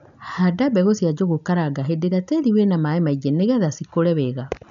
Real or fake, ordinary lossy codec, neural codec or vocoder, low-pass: real; none; none; 7.2 kHz